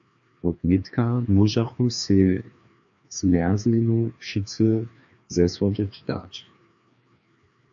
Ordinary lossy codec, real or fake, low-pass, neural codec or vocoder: AAC, 64 kbps; fake; 7.2 kHz; codec, 16 kHz, 2 kbps, FreqCodec, larger model